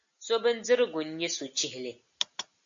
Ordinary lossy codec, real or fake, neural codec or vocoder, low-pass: AAC, 64 kbps; real; none; 7.2 kHz